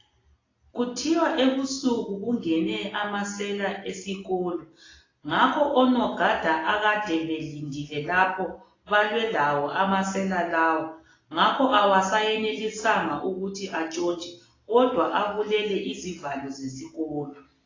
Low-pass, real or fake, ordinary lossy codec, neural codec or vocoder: 7.2 kHz; real; AAC, 32 kbps; none